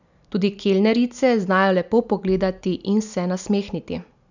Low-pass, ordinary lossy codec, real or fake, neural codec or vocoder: 7.2 kHz; none; real; none